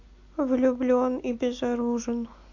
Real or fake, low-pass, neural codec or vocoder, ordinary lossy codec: real; 7.2 kHz; none; AAC, 48 kbps